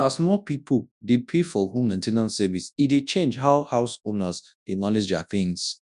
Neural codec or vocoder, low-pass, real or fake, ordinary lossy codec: codec, 24 kHz, 0.9 kbps, WavTokenizer, large speech release; 10.8 kHz; fake; none